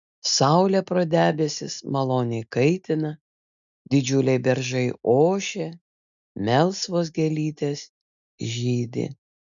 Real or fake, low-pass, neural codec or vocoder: real; 7.2 kHz; none